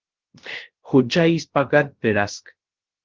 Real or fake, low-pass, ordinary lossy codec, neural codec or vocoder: fake; 7.2 kHz; Opus, 16 kbps; codec, 16 kHz, 0.3 kbps, FocalCodec